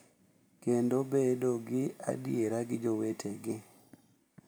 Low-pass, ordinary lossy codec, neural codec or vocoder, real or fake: none; none; none; real